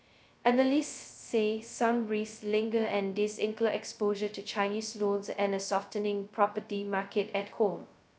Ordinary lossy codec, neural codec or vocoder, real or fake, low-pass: none; codec, 16 kHz, 0.2 kbps, FocalCodec; fake; none